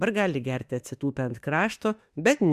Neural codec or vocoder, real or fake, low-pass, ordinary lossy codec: autoencoder, 48 kHz, 32 numbers a frame, DAC-VAE, trained on Japanese speech; fake; 14.4 kHz; Opus, 64 kbps